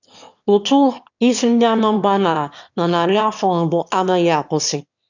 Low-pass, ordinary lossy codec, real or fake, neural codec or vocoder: 7.2 kHz; none; fake; autoencoder, 22.05 kHz, a latent of 192 numbers a frame, VITS, trained on one speaker